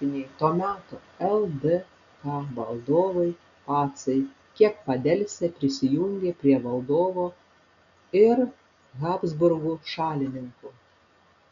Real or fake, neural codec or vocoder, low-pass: real; none; 7.2 kHz